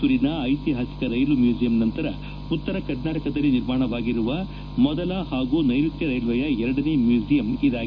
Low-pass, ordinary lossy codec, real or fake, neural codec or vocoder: 7.2 kHz; none; real; none